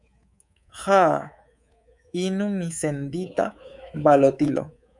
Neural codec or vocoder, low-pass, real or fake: codec, 24 kHz, 3.1 kbps, DualCodec; 10.8 kHz; fake